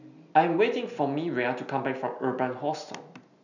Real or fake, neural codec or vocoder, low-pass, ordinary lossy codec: real; none; 7.2 kHz; none